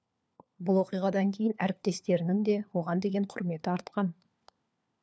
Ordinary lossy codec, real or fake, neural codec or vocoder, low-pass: none; fake; codec, 16 kHz, 4 kbps, FunCodec, trained on LibriTTS, 50 frames a second; none